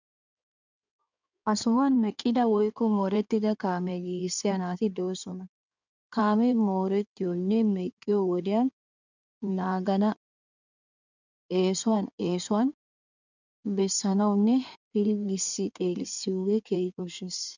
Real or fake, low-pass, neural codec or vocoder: fake; 7.2 kHz; codec, 16 kHz in and 24 kHz out, 2.2 kbps, FireRedTTS-2 codec